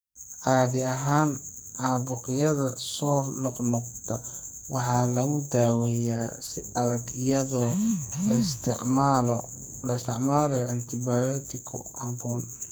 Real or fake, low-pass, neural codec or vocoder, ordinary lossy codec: fake; none; codec, 44.1 kHz, 2.6 kbps, SNAC; none